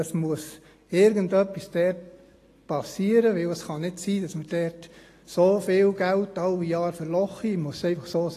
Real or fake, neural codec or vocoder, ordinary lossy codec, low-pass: real; none; AAC, 48 kbps; 14.4 kHz